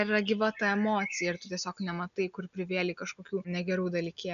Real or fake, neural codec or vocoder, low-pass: real; none; 7.2 kHz